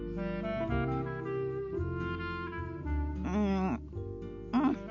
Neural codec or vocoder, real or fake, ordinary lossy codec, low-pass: none; real; none; 7.2 kHz